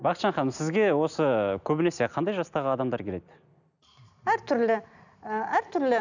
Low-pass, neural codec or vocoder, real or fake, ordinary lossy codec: 7.2 kHz; none; real; none